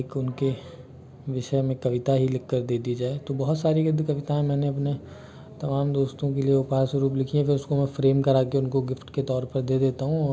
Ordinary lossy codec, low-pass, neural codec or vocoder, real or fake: none; none; none; real